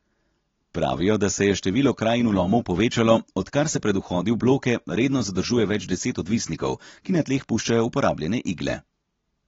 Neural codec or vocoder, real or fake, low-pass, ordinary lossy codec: none; real; 7.2 kHz; AAC, 24 kbps